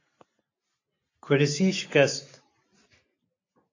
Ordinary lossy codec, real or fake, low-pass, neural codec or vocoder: AAC, 48 kbps; real; 7.2 kHz; none